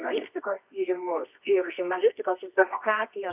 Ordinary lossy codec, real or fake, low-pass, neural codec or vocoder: AAC, 32 kbps; fake; 3.6 kHz; codec, 24 kHz, 0.9 kbps, WavTokenizer, medium music audio release